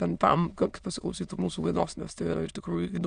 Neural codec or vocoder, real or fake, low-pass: autoencoder, 22.05 kHz, a latent of 192 numbers a frame, VITS, trained on many speakers; fake; 9.9 kHz